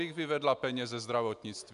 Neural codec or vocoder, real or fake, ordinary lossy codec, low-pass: none; real; MP3, 96 kbps; 10.8 kHz